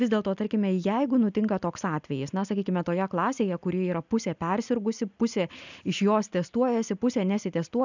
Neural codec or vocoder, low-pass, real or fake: none; 7.2 kHz; real